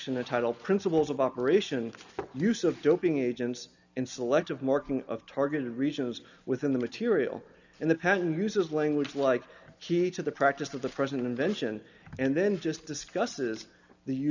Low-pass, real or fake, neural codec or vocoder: 7.2 kHz; real; none